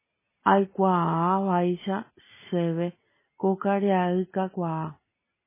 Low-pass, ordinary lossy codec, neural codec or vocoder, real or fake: 3.6 kHz; MP3, 16 kbps; none; real